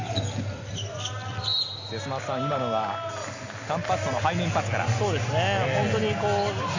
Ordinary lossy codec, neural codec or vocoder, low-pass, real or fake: AAC, 48 kbps; none; 7.2 kHz; real